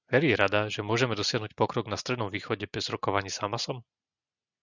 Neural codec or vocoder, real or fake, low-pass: none; real; 7.2 kHz